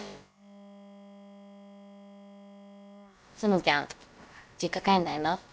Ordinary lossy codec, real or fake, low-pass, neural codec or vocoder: none; fake; none; codec, 16 kHz, about 1 kbps, DyCAST, with the encoder's durations